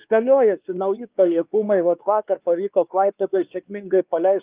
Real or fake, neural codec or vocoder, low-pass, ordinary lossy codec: fake; codec, 16 kHz, 2 kbps, X-Codec, WavLM features, trained on Multilingual LibriSpeech; 3.6 kHz; Opus, 32 kbps